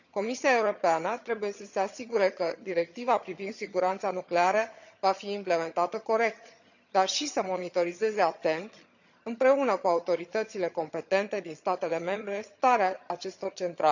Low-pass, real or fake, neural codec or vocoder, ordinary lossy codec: 7.2 kHz; fake; vocoder, 22.05 kHz, 80 mel bands, HiFi-GAN; none